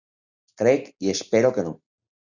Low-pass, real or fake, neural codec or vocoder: 7.2 kHz; real; none